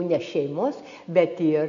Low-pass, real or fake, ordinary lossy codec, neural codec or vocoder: 7.2 kHz; real; AAC, 64 kbps; none